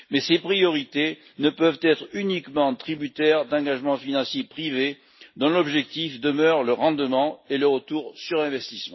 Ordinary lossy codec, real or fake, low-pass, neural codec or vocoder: MP3, 24 kbps; real; 7.2 kHz; none